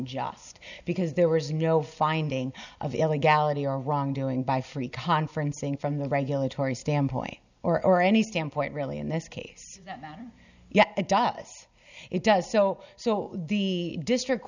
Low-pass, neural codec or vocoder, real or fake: 7.2 kHz; none; real